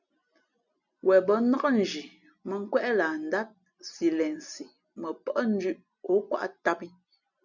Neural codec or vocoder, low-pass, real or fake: none; 7.2 kHz; real